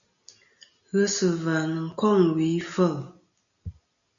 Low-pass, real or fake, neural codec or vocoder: 7.2 kHz; real; none